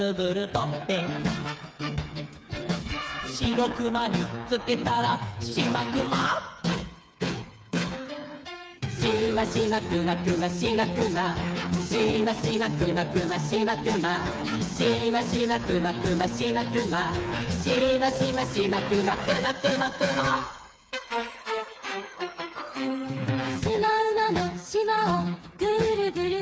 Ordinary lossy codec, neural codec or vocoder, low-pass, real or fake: none; codec, 16 kHz, 4 kbps, FreqCodec, smaller model; none; fake